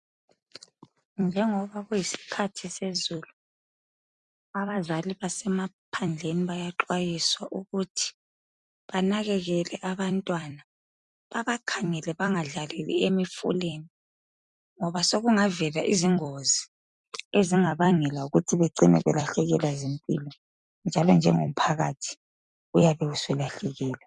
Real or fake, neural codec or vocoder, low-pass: fake; vocoder, 44.1 kHz, 128 mel bands every 256 samples, BigVGAN v2; 10.8 kHz